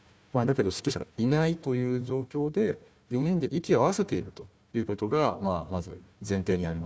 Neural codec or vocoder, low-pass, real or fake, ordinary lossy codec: codec, 16 kHz, 1 kbps, FunCodec, trained on Chinese and English, 50 frames a second; none; fake; none